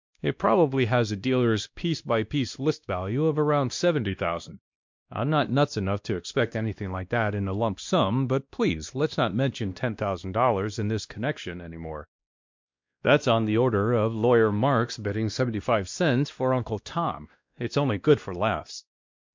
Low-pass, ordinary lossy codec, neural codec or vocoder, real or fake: 7.2 kHz; MP3, 64 kbps; codec, 16 kHz, 1 kbps, X-Codec, WavLM features, trained on Multilingual LibriSpeech; fake